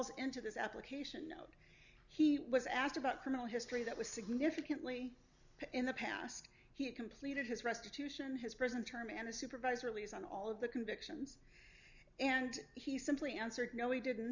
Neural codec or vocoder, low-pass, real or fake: none; 7.2 kHz; real